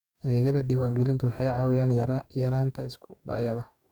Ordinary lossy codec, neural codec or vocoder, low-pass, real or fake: none; codec, 44.1 kHz, 2.6 kbps, DAC; 19.8 kHz; fake